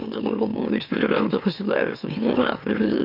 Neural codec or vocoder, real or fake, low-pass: autoencoder, 44.1 kHz, a latent of 192 numbers a frame, MeloTTS; fake; 5.4 kHz